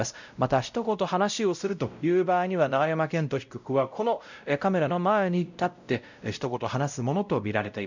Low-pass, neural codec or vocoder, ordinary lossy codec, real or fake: 7.2 kHz; codec, 16 kHz, 0.5 kbps, X-Codec, WavLM features, trained on Multilingual LibriSpeech; none; fake